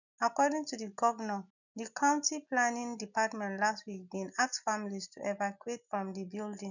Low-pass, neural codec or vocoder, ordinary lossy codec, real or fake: 7.2 kHz; none; none; real